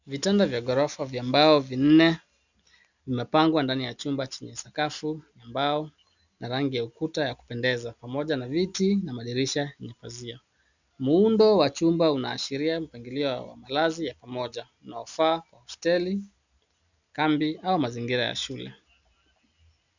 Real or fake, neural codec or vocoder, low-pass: real; none; 7.2 kHz